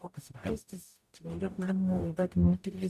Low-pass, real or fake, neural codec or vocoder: 14.4 kHz; fake; codec, 44.1 kHz, 0.9 kbps, DAC